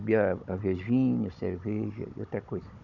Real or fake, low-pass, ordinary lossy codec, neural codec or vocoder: fake; 7.2 kHz; none; codec, 16 kHz, 16 kbps, FunCodec, trained on Chinese and English, 50 frames a second